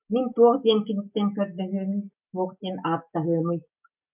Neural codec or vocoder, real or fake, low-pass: none; real; 3.6 kHz